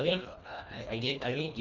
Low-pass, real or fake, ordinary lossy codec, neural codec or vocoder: 7.2 kHz; fake; none; codec, 24 kHz, 1.5 kbps, HILCodec